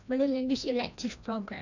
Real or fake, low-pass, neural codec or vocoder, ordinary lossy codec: fake; 7.2 kHz; codec, 16 kHz, 1 kbps, FreqCodec, larger model; none